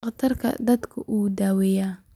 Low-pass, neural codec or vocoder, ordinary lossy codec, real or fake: 19.8 kHz; none; none; real